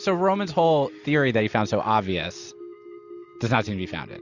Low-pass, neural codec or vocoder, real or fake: 7.2 kHz; none; real